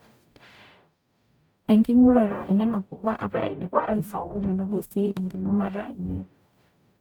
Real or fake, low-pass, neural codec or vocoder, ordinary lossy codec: fake; 19.8 kHz; codec, 44.1 kHz, 0.9 kbps, DAC; none